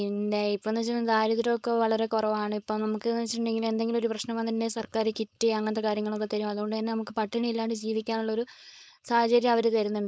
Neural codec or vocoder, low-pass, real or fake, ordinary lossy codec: codec, 16 kHz, 4.8 kbps, FACodec; none; fake; none